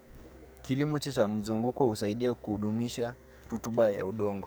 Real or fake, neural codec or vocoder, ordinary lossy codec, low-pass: fake; codec, 44.1 kHz, 2.6 kbps, SNAC; none; none